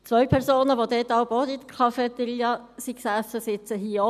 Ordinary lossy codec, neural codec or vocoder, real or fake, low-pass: none; none; real; 14.4 kHz